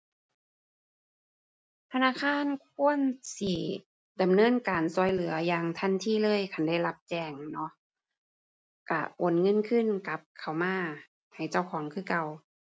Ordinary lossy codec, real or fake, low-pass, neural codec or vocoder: none; real; none; none